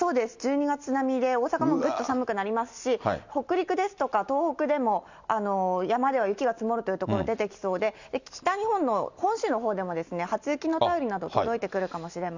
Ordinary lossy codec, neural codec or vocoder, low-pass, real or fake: Opus, 64 kbps; none; 7.2 kHz; real